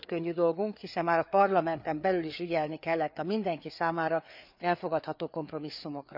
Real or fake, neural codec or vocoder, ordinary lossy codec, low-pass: fake; codec, 16 kHz, 4 kbps, FreqCodec, larger model; none; 5.4 kHz